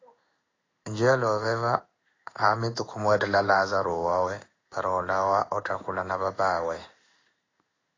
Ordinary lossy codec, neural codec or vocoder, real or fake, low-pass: AAC, 32 kbps; codec, 16 kHz in and 24 kHz out, 1 kbps, XY-Tokenizer; fake; 7.2 kHz